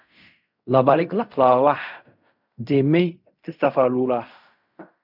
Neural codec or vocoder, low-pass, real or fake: codec, 16 kHz in and 24 kHz out, 0.4 kbps, LongCat-Audio-Codec, fine tuned four codebook decoder; 5.4 kHz; fake